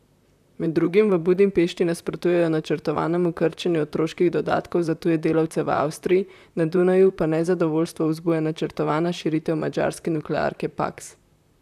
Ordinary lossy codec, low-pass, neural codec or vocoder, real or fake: none; 14.4 kHz; vocoder, 44.1 kHz, 128 mel bands, Pupu-Vocoder; fake